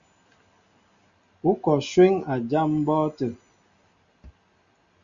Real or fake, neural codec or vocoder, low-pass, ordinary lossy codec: real; none; 7.2 kHz; Opus, 64 kbps